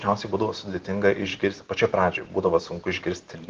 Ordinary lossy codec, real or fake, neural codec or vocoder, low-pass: Opus, 16 kbps; real; none; 7.2 kHz